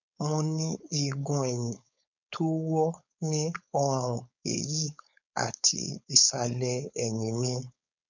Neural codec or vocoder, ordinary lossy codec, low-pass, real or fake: codec, 16 kHz, 4.8 kbps, FACodec; none; 7.2 kHz; fake